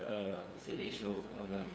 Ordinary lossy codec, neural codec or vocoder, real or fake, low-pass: none; codec, 16 kHz, 2 kbps, FunCodec, trained on LibriTTS, 25 frames a second; fake; none